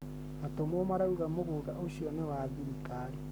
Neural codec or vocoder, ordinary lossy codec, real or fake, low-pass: codec, 44.1 kHz, 7.8 kbps, Pupu-Codec; none; fake; none